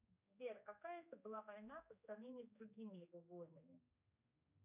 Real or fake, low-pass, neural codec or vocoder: fake; 3.6 kHz; codec, 16 kHz, 2 kbps, X-Codec, HuBERT features, trained on general audio